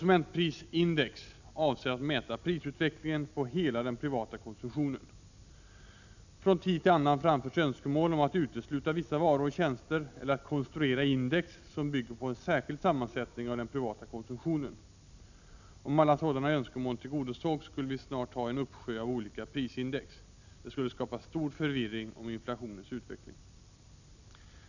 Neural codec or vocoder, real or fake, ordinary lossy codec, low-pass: none; real; none; 7.2 kHz